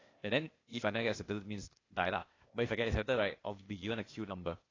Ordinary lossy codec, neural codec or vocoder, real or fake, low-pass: AAC, 32 kbps; codec, 16 kHz, 0.8 kbps, ZipCodec; fake; 7.2 kHz